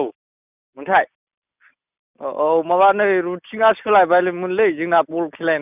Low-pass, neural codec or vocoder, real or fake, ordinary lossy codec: 3.6 kHz; none; real; none